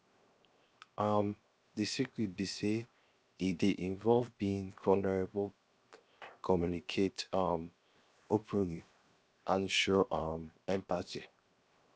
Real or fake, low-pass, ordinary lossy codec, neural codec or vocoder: fake; none; none; codec, 16 kHz, 0.7 kbps, FocalCodec